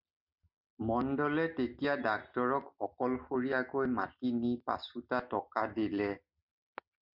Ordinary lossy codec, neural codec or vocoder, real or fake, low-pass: MP3, 48 kbps; none; real; 5.4 kHz